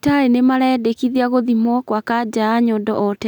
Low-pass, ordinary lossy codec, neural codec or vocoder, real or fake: 19.8 kHz; none; none; real